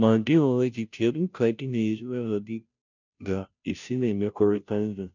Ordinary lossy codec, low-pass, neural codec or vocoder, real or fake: none; 7.2 kHz; codec, 16 kHz, 0.5 kbps, FunCodec, trained on Chinese and English, 25 frames a second; fake